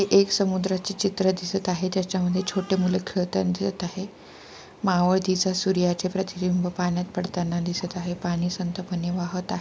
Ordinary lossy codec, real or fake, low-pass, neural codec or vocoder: none; real; none; none